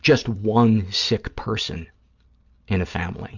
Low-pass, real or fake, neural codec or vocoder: 7.2 kHz; real; none